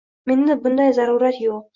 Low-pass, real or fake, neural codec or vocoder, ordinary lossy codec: 7.2 kHz; real; none; Opus, 64 kbps